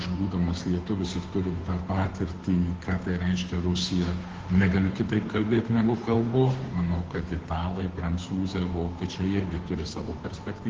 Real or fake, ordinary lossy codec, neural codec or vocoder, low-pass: fake; Opus, 16 kbps; codec, 16 kHz, 2 kbps, FunCodec, trained on Chinese and English, 25 frames a second; 7.2 kHz